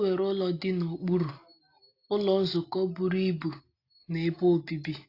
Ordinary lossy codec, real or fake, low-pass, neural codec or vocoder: AAC, 32 kbps; real; 5.4 kHz; none